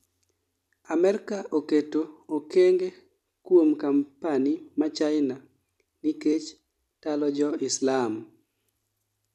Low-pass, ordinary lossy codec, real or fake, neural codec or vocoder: 14.4 kHz; none; real; none